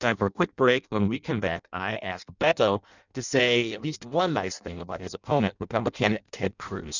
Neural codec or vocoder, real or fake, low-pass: codec, 16 kHz in and 24 kHz out, 0.6 kbps, FireRedTTS-2 codec; fake; 7.2 kHz